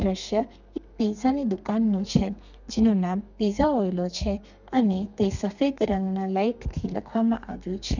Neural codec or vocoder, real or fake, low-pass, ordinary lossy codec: codec, 32 kHz, 1.9 kbps, SNAC; fake; 7.2 kHz; none